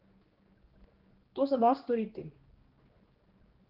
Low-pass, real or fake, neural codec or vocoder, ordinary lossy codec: 5.4 kHz; fake; codec, 16 kHz, 2 kbps, X-Codec, HuBERT features, trained on general audio; Opus, 16 kbps